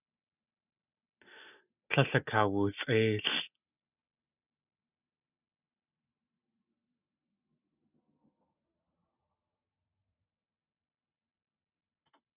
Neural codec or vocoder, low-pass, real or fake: none; 3.6 kHz; real